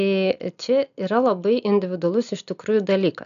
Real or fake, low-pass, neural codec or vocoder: real; 7.2 kHz; none